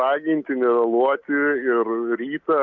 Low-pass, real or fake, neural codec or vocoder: 7.2 kHz; real; none